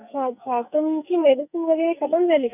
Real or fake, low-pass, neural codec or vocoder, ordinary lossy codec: fake; 3.6 kHz; codec, 44.1 kHz, 2.6 kbps, SNAC; none